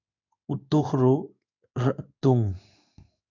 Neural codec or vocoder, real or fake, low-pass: codec, 16 kHz in and 24 kHz out, 1 kbps, XY-Tokenizer; fake; 7.2 kHz